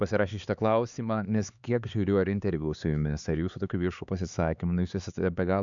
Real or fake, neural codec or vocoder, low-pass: fake; codec, 16 kHz, 4 kbps, X-Codec, HuBERT features, trained on LibriSpeech; 7.2 kHz